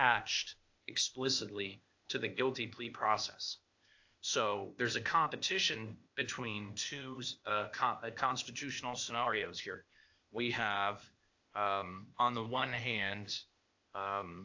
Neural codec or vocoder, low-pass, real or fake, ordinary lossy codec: codec, 16 kHz, 0.8 kbps, ZipCodec; 7.2 kHz; fake; AAC, 48 kbps